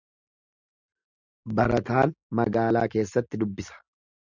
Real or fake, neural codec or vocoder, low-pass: real; none; 7.2 kHz